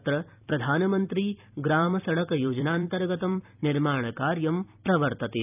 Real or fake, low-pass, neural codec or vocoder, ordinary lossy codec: fake; 3.6 kHz; vocoder, 44.1 kHz, 128 mel bands every 256 samples, BigVGAN v2; none